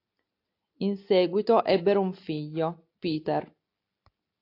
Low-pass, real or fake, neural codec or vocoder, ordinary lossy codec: 5.4 kHz; real; none; AAC, 32 kbps